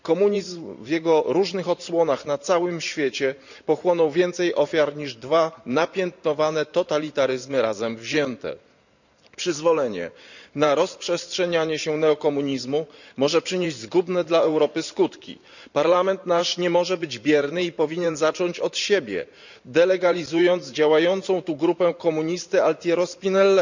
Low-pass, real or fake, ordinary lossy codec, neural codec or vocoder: 7.2 kHz; fake; none; vocoder, 44.1 kHz, 128 mel bands every 512 samples, BigVGAN v2